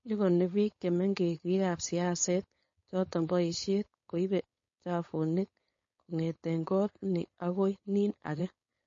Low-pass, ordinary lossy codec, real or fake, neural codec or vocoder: 7.2 kHz; MP3, 32 kbps; fake; codec, 16 kHz, 4.8 kbps, FACodec